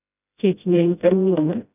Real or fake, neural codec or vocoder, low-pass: fake; codec, 16 kHz, 0.5 kbps, FreqCodec, smaller model; 3.6 kHz